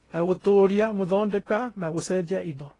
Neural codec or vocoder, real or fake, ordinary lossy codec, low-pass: codec, 16 kHz in and 24 kHz out, 0.6 kbps, FocalCodec, streaming, 2048 codes; fake; AAC, 32 kbps; 10.8 kHz